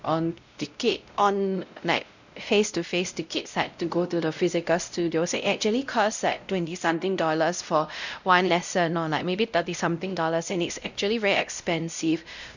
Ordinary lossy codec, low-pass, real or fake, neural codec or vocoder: none; 7.2 kHz; fake; codec, 16 kHz, 0.5 kbps, X-Codec, WavLM features, trained on Multilingual LibriSpeech